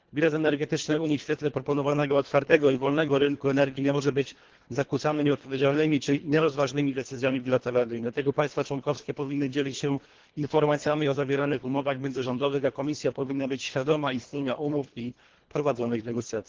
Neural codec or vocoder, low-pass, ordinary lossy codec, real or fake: codec, 24 kHz, 1.5 kbps, HILCodec; 7.2 kHz; Opus, 16 kbps; fake